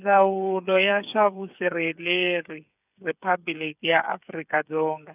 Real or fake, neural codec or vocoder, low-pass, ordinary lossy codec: fake; codec, 16 kHz, 8 kbps, FreqCodec, smaller model; 3.6 kHz; none